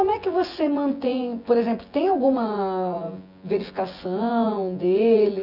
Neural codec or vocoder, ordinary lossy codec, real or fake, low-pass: vocoder, 24 kHz, 100 mel bands, Vocos; none; fake; 5.4 kHz